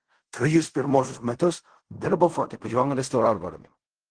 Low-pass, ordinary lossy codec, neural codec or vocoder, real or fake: 9.9 kHz; Opus, 16 kbps; codec, 16 kHz in and 24 kHz out, 0.4 kbps, LongCat-Audio-Codec, fine tuned four codebook decoder; fake